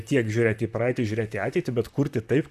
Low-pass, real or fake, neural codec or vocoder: 14.4 kHz; fake; vocoder, 44.1 kHz, 128 mel bands, Pupu-Vocoder